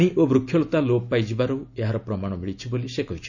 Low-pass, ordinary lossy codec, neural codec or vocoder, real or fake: 7.2 kHz; none; none; real